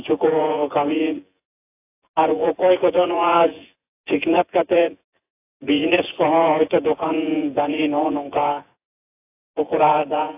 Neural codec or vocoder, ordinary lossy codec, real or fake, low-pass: vocoder, 24 kHz, 100 mel bands, Vocos; AAC, 24 kbps; fake; 3.6 kHz